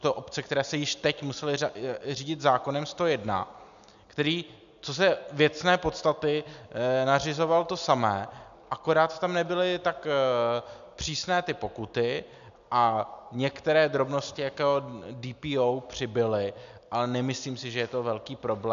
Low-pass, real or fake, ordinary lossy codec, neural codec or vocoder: 7.2 kHz; real; MP3, 96 kbps; none